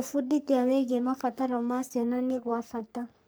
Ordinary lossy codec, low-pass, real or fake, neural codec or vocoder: none; none; fake; codec, 44.1 kHz, 3.4 kbps, Pupu-Codec